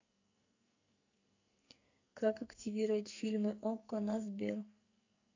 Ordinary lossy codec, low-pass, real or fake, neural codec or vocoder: AAC, 48 kbps; 7.2 kHz; fake; codec, 44.1 kHz, 2.6 kbps, SNAC